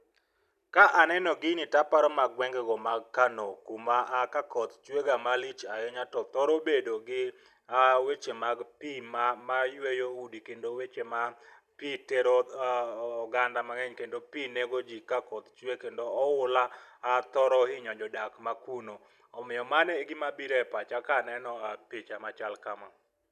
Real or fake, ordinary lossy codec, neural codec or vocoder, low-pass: real; none; none; 14.4 kHz